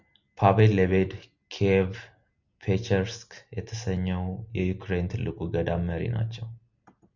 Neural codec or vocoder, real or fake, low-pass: none; real; 7.2 kHz